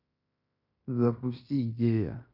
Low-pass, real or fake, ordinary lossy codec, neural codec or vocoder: 5.4 kHz; fake; none; codec, 16 kHz in and 24 kHz out, 0.9 kbps, LongCat-Audio-Codec, fine tuned four codebook decoder